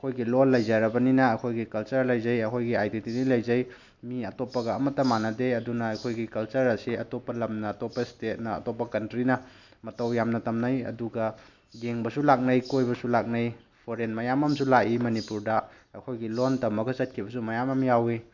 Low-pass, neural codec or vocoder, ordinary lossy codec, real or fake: 7.2 kHz; none; none; real